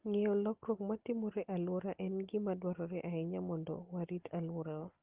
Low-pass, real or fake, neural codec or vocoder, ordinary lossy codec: 3.6 kHz; real; none; Opus, 16 kbps